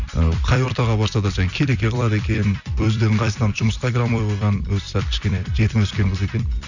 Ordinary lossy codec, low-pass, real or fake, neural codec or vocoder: none; 7.2 kHz; fake; vocoder, 44.1 kHz, 128 mel bands every 256 samples, BigVGAN v2